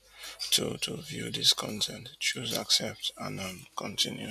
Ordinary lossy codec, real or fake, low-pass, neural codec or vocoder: none; real; 14.4 kHz; none